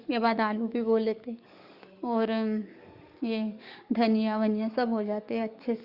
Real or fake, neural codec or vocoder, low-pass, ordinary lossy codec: fake; codec, 44.1 kHz, 7.8 kbps, DAC; 5.4 kHz; Opus, 64 kbps